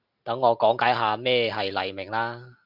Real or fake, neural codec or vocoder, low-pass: real; none; 5.4 kHz